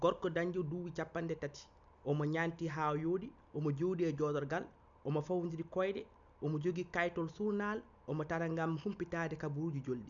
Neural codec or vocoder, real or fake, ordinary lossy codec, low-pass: none; real; none; 7.2 kHz